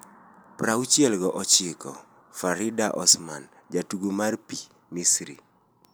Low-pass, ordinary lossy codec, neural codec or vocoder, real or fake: none; none; none; real